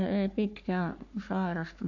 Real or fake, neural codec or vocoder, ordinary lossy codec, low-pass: fake; codec, 44.1 kHz, 3.4 kbps, Pupu-Codec; none; 7.2 kHz